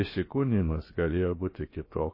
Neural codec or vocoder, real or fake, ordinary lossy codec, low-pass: codec, 16 kHz, about 1 kbps, DyCAST, with the encoder's durations; fake; MP3, 24 kbps; 5.4 kHz